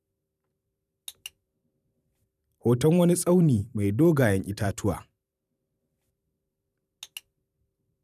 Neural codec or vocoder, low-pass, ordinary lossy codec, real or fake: vocoder, 44.1 kHz, 128 mel bands every 512 samples, BigVGAN v2; 14.4 kHz; none; fake